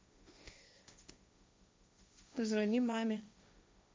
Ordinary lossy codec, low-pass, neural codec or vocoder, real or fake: none; none; codec, 16 kHz, 1.1 kbps, Voila-Tokenizer; fake